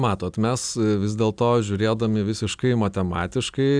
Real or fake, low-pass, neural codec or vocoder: real; 9.9 kHz; none